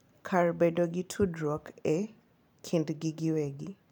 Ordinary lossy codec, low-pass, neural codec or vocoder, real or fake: none; 19.8 kHz; vocoder, 44.1 kHz, 128 mel bands every 512 samples, BigVGAN v2; fake